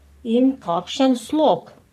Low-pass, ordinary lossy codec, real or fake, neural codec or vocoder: 14.4 kHz; none; fake; codec, 44.1 kHz, 3.4 kbps, Pupu-Codec